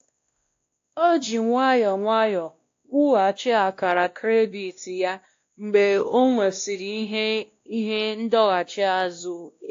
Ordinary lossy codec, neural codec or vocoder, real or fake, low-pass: AAC, 48 kbps; codec, 16 kHz, 1 kbps, X-Codec, WavLM features, trained on Multilingual LibriSpeech; fake; 7.2 kHz